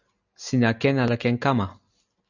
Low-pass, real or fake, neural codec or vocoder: 7.2 kHz; real; none